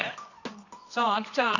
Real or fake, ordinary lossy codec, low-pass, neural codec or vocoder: fake; none; 7.2 kHz; codec, 24 kHz, 0.9 kbps, WavTokenizer, medium music audio release